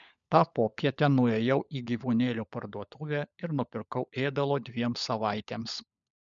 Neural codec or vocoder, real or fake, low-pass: codec, 16 kHz, 16 kbps, FunCodec, trained on LibriTTS, 50 frames a second; fake; 7.2 kHz